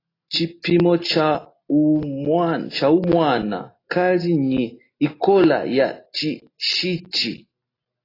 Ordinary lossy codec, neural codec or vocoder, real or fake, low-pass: AAC, 24 kbps; none; real; 5.4 kHz